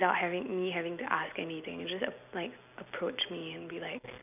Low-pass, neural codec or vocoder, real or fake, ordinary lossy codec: 3.6 kHz; none; real; none